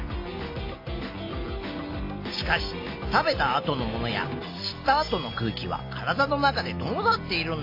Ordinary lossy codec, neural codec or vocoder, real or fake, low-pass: AAC, 32 kbps; none; real; 5.4 kHz